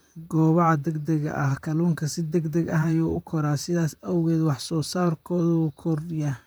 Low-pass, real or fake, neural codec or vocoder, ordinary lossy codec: none; fake; vocoder, 44.1 kHz, 128 mel bands, Pupu-Vocoder; none